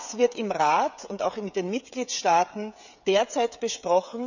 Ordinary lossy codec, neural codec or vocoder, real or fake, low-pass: none; codec, 16 kHz, 16 kbps, FreqCodec, smaller model; fake; 7.2 kHz